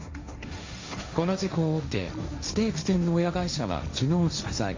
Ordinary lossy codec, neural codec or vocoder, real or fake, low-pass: MP3, 64 kbps; codec, 16 kHz, 1.1 kbps, Voila-Tokenizer; fake; 7.2 kHz